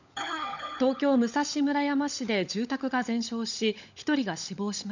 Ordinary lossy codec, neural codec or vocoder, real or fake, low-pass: none; codec, 16 kHz, 16 kbps, FunCodec, trained on LibriTTS, 50 frames a second; fake; 7.2 kHz